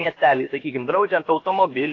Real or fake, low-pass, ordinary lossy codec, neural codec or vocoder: fake; 7.2 kHz; AAC, 32 kbps; codec, 16 kHz, about 1 kbps, DyCAST, with the encoder's durations